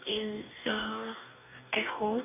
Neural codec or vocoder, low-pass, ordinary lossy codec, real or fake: codec, 44.1 kHz, 2.6 kbps, DAC; 3.6 kHz; none; fake